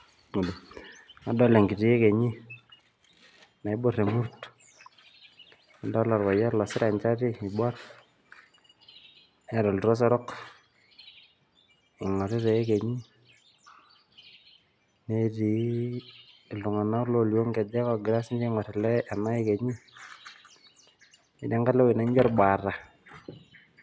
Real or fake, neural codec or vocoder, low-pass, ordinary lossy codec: real; none; none; none